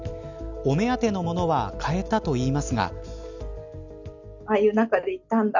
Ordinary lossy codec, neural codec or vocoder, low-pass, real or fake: none; none; 7.2 kHz; real